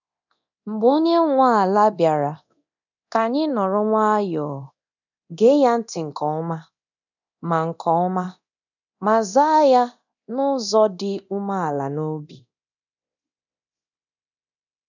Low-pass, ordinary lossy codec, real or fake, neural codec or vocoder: 7.2 kHz; none; fake; codec, 24 kHz, 0.9 kbps, DualCodec